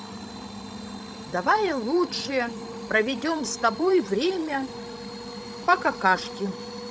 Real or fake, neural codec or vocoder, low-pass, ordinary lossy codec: fake; codec, 16 kHz, 16 kbps, FreqCodec, larger model; none; none